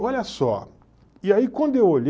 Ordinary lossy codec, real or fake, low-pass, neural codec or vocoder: none; real; none; none